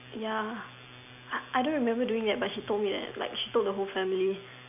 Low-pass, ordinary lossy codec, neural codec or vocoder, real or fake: 3.6 kHz; none; none; real